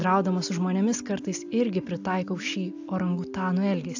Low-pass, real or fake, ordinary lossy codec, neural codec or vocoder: 7.2 kHz; real; AAC, 48 kbps; none